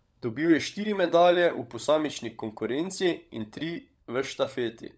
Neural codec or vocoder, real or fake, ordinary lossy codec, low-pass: codec, 16 kHz, 16 kbps, FunCodec, trained on LibriTTS, 50 frames a second; fake; none; none